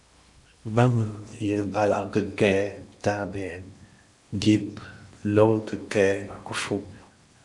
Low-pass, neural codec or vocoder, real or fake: 10.8 kHz; codec, 16 kHz in and 24 kHz out, 0.8 kbps, FocalCodec, streaming, 65536 codes; fake